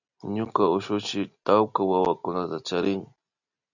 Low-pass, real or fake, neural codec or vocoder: 7.2 kHz; real; none